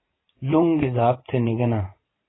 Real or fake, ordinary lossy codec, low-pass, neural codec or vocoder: fake; AAC, 16 kbps; 7.2 kHz; vocoder, 44.1 kHz, 80 mel bands, Vocos